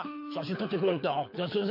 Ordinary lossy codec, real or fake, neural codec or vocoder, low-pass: MP3, 32 kbps; fake; codec, 24 kHz, 6 kbps, HILCodec; 5.4 kHz